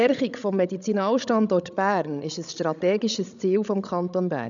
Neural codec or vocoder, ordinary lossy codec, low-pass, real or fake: codec, 16 kHz, 16 kbps, FreqCodec, larger model; none; 7.2 kHz; fake